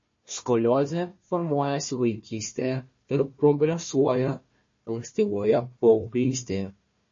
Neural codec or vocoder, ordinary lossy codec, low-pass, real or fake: codec, 16 kHz, 1 kbps, FunCodec, trained on Chinese and English, 50 frames a second; MP3, 32 kbps; 7.2 kHz; fake